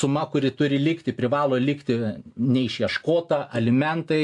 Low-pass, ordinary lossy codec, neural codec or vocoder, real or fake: 10.8 kHz; AAC, 64 kbps; none; real